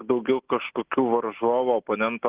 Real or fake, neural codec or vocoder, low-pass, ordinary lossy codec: real; none; 3.6 kHz; Opus, 16 kbps